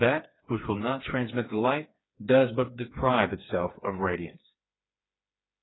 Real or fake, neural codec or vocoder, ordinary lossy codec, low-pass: fake; codec, 16 kHz, 4 kbps, FreqCodec, smaller model; AAC, 16 kbps; 7.2 kHz